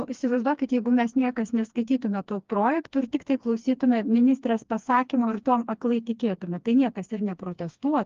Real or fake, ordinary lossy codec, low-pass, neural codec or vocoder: fake; Opus, 32 kbps; 7.2 kHz; codec, 16 kHz, 2 kbps, FreqCodec, smaller model